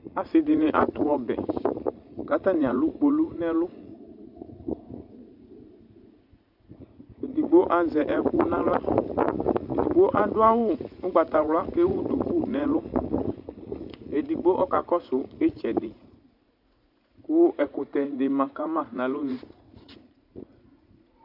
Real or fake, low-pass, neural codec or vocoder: fake; 5.4 kHz; vocoder, 44.1 kHz, 80 mel bands, Vocos